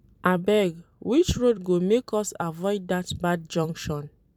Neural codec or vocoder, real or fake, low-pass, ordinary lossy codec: vocoder, 44.1 kHz, 128 mel bands every 256 samples, BigVGAN v2; fake; 19.8 kHz; none